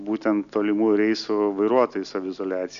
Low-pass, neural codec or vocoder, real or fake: 7.2 kHz; none; real